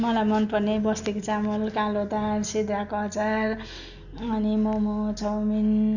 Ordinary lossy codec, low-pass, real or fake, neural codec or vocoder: none; 7.2 kHz; real; none